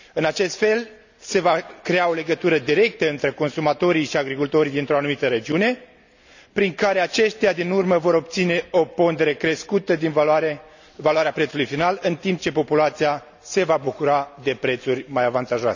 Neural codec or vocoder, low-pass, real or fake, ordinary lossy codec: none; 7.2 kHz; real; none